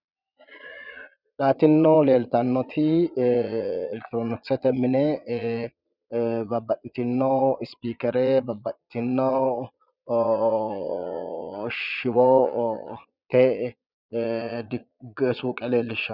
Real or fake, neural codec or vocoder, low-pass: fake; vocoder, 22.05 kHz, 80 mel bands, Vocos; 5.4 kHz